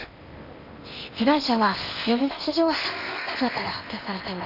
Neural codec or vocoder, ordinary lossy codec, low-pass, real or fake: codec, 16 kHz in and 24 kHz out, 0.8 kbps, FocalCodec, streaming, 65536 codes; none; 5.4 kHz; fake